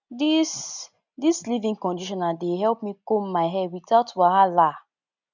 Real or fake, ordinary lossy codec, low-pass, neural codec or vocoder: real; none; 7.2 kHz; none